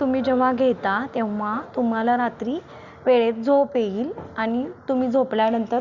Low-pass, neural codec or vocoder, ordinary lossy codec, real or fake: 7.2 kHz; none; Opus, 64 kbps; real